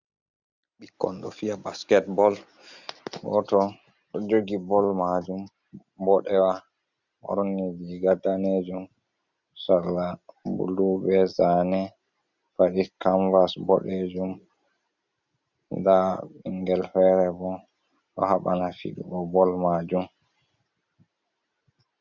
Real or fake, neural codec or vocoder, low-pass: real; none; 7.2 kHz